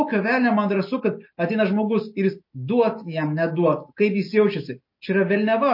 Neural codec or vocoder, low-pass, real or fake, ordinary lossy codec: none; 5.4 kHz; real; MP3, 32 kbps